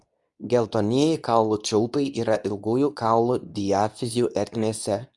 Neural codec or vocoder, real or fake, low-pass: codec, 24 kHz, 0.9 kbps, WavTokenizer, medium speech release version 2; fake; 10.8 kHz